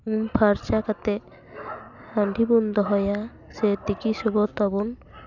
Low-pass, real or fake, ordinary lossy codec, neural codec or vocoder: 7.2 kHz; real; none; none